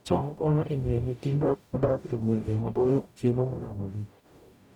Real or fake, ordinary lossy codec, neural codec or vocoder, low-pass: fake; none; codec, 44.1 kHz, 0.9 kbps, DAC; 19.8 kHz